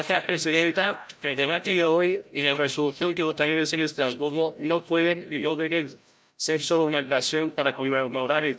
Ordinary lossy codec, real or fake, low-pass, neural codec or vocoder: none; fake; none; codec, 16 kHz, 0.5 kbps, FreqCodec, larger model